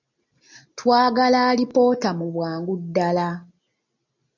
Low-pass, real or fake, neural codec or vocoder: 7.2 kHz; real; none